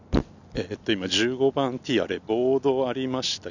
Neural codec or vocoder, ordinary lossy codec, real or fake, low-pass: none; none; real; 7.2 kHz